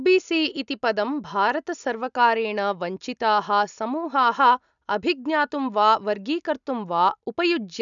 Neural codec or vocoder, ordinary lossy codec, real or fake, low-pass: none; none; real; 7.2 kHz